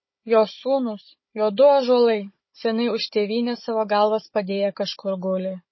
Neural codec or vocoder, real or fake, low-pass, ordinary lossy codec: codec, 16 kHz, 16 kbps, FunCodec, trained on Chinese and English, 50 frames a second; fake; 7.2 kHz; MP3, 24 kbps